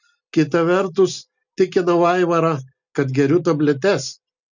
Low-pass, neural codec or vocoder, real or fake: 7.2 kHz; none; real